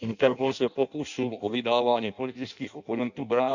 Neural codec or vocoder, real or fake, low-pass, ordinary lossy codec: codec, 16 kHz in and 24 kHz out, 0.6 kbps, FireRedTTS-2 codec; fake; 7.2 kHz; none